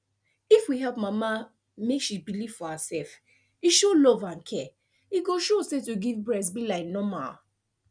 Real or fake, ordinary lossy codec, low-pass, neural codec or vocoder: real; none; 9.9 kHz; none